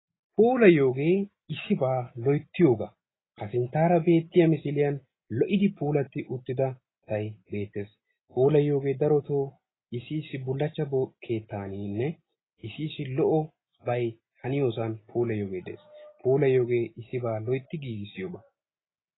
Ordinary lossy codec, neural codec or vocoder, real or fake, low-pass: AAC, 16 kbps; none; real; 7.2 kHz